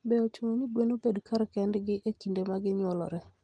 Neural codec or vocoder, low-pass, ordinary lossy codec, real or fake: none; 9.9 kHz; Opus, 24 kbps; real